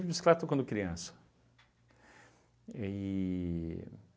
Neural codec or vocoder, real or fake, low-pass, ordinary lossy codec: none; real; none; none